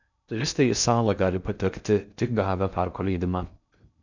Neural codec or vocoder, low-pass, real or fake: codec, 16 kHz in and 24 kHz out, 0.6 kbps, FocalCodec, streaming, 2048 codes; 7.2 kHz; fake